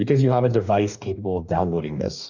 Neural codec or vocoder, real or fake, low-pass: codec, 44.1 kHz, 2.6 kbps, DAC; fake; 7.2 kHz